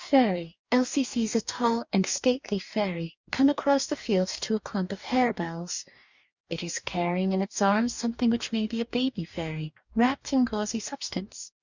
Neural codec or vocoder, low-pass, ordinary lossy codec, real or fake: codec, 44.1 kHz, 2.6 kbps, DAC; 7.2 kHz; Opus, 64 kbps; fake